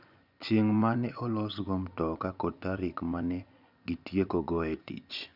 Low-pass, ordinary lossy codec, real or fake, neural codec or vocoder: 5.4 kHz; none; fake; vocoder, 24 kHz, 100 mel bands, Vocos